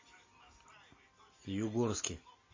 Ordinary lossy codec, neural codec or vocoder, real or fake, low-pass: MP3, 32 kbps; none; real; 7.2 kHz